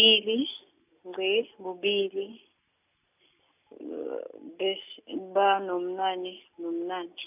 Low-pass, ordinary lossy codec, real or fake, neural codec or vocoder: 3.6 kHz; none; real; none